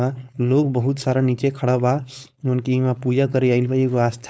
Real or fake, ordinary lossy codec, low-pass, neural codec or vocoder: fake; none; none; codec, 16 kHz, 4.8 kbps, FACodec